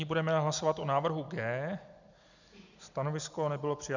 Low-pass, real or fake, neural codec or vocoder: 7.2 kHz; real; none